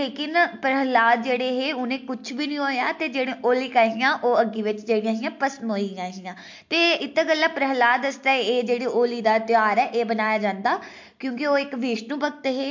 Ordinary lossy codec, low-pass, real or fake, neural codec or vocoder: MP3, 48 kbps; 7.2 kHz; real; none